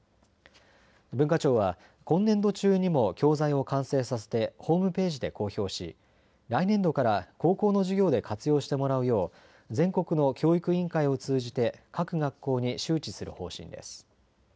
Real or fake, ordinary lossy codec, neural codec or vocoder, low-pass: real; none; none; none